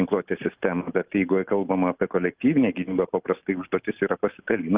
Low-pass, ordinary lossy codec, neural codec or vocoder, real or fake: 3.6 kHz; Opus, 32 kbps; none; real